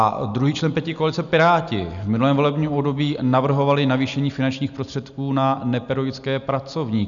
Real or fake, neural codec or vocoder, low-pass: real; none; 7.2 kHz